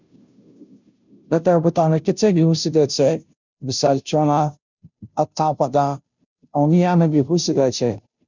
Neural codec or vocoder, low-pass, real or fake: codec, 16 kHz, 0.5 kbps, FunCodec, trained on Chinese and English, 25 frames a second; 7.2 kHz; fake